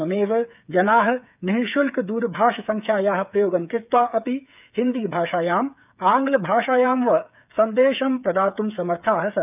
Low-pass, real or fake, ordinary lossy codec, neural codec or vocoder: 3.6 kHz; fake; none; codec, 16 kHz, 8 kbps, FreqCodec, smaller model